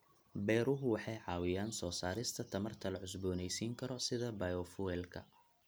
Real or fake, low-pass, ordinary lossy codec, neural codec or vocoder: real; none; none; none